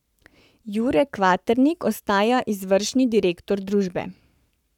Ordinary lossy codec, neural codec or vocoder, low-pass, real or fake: none; codec, 44.1 kHz, 7.8 kbps, Pupu-Codec; 19.8 kHz; fake